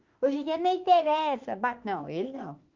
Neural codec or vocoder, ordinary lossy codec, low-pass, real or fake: autoencoder, 48 kHz, 32 numbers a frame, DAC-VAE, trained on Japanese speech; Opus, 24 kbps; 7.2 kHz; fake